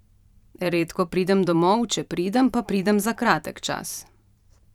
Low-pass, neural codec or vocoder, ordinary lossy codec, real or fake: 19.8 kHz; vocoder, 44.1 kHz, 128 mel bands every 512 samples, BigVGAN v2; none; fake